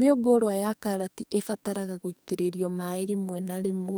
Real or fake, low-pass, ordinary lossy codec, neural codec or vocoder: fake; none; none; codec, 44.1 kHz, 2.6 kbps, SNAC